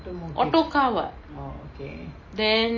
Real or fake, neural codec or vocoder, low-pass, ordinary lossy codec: real; none; 7.2 kHz; MP3, 32 kbps